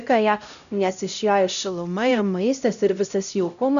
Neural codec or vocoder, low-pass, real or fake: codec, 16 kHz, 0.5 kbps, X-Codec, HuBERT features, trained on LibriSpeech; 7.2 kHz; fake